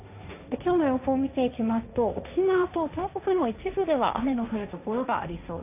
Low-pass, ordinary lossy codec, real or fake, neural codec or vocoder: 3.6 kHz; none; fake; codec, 16 kHz, 1.1 kbps, Voila-Tokenizer